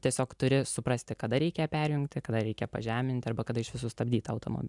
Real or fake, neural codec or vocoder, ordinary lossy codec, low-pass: real; none; MP3, 96 kbps; 10.8 kHz